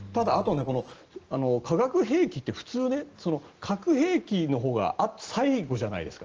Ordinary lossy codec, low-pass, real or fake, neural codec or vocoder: Opus, 16 kbps; 7.2 kHz; real; none